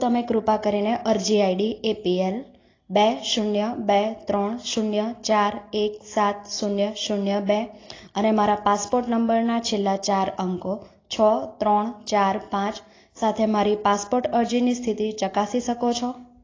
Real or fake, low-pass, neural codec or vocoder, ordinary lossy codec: real; 7.2 kHz; none; AAC, 32 kbps